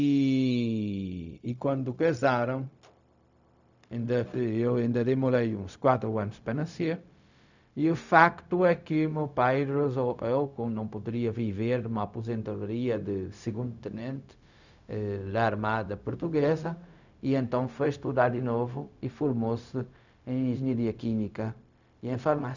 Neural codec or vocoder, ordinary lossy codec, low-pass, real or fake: codec, 16 kHz, 0.4 kbps, LongCat-Audio-Codec; none; 7.2 kHz; fake